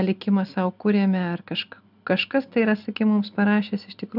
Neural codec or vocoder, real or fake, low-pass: none; real; 5.4 kHz